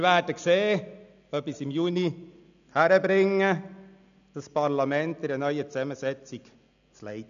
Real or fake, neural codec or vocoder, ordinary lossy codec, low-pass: real; none; none; 7.2 kHz